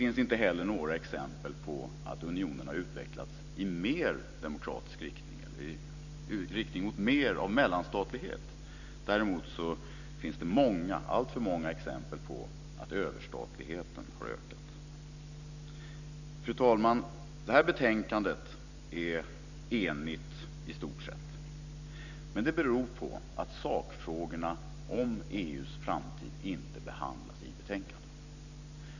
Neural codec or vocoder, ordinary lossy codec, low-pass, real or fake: none; none; 7.2 kHz; real